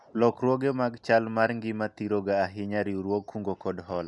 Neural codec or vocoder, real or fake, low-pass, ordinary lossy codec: none; real; 10.8 kHz; none